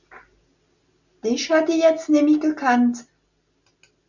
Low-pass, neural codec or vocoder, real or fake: 7.2 kHz; none; real